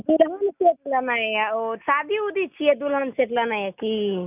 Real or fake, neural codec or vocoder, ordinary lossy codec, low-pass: real; none; none; 3.6 kHz